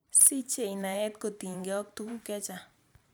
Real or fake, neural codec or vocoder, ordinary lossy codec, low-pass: fake; vocoder, 44.1 kHz, 128 mel bands every 512 samples, BigVGAN v2; none; none